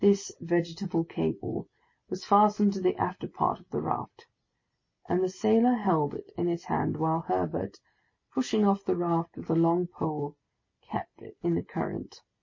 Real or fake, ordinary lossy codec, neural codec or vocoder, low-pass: real; MP3, 32 kbps; none; 7.2 kHz